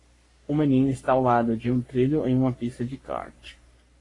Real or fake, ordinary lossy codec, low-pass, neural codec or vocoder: fake; AAC, 32 kbps; 10.8 kHz; codec, 44.1 kHz, 3.4 kbps, Pupu-Codec